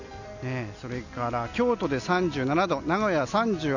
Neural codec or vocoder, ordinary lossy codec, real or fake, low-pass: none; none; real; 7.2 kHz